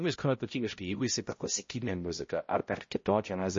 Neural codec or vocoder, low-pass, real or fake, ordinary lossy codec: codec, 16 kHz, 0.5 kbps, X-Codec, HuBERT features, trained on balanced general audio; 7.2 kHz; fake; MP3, 32 kbps